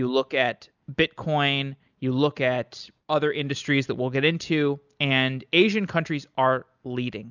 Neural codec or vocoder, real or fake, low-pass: none; real; 7.2 kHz